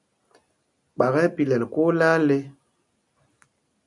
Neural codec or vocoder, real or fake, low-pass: none; real; 10.8 kHz